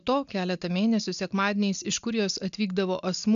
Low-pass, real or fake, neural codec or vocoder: 7.2 kHz; real; none